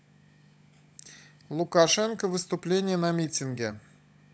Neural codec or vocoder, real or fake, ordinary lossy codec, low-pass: codec, 16 kHz, 16 kbps, FunCodec, trained on LibriTTS, 50 frames a second; fake; none; none